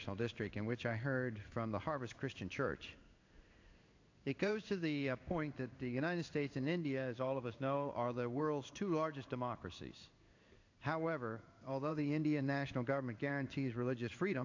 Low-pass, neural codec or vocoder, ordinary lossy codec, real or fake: 7.2 kHz; codec, 16 kHz in and 24 kHz out, 1 kbps, XY-Tokenizer; AAC, 48 kbps; fake